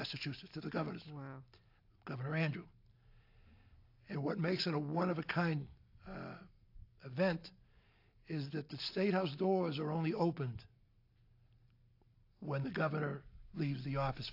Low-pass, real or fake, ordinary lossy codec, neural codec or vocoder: 5.4 kHz; real; AAC, 32 kbps; none